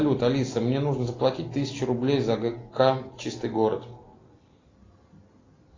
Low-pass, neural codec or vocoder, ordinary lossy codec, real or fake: 7.2 kHz; none; AAC, 32 kbps; real